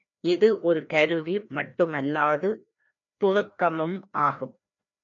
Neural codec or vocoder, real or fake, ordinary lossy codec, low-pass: codec, 16 kHz, 1 kbps, FreqCodec, larger model; fake; MP3, 96 kbps; 7.2 kHz